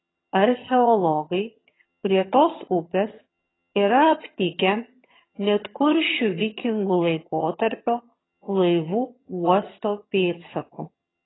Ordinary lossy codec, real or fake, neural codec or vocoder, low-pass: AAC, 16 kbps; fake; vocoder, 22.05 kHz, 80 mel bands, HiFi-GAN; 7.2 kHz